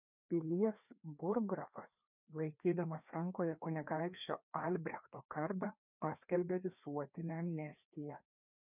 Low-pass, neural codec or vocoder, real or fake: 3.6 kHz; codec, 16 kHz, 2 kbps, FreqCodec, larger model; fake